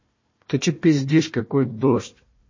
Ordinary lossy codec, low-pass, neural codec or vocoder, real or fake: MP3, 32 kbps; 7.2 kHz; codec, 16 kHz, 1 kbps, FunCodec, trained on Chinese and English, 50 frames a second; fake